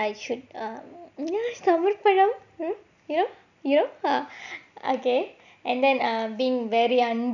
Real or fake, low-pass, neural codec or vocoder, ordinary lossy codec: real; 7.2 kHz; none; none